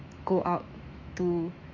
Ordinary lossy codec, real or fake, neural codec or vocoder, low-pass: MP3, 64 kbps; fake; autoencoder, 48 kHz, 128 numbers a frame, DAC-VAE, trained on Japanese speech; 7.2 kHz